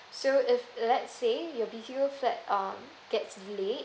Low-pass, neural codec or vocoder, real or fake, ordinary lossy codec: none; none; real; none